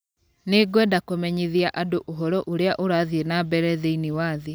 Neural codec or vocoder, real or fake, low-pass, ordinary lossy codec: none; real; none; none